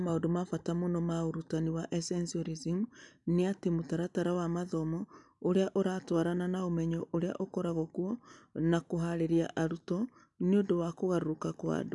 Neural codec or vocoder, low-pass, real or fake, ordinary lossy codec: none; 10.8 kHz; real; none